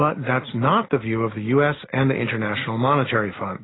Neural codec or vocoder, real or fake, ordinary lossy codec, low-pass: none; real; AAC, 16 kbps; 7.2 kHz